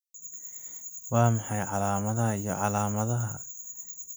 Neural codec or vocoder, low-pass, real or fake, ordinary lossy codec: none; none; real; none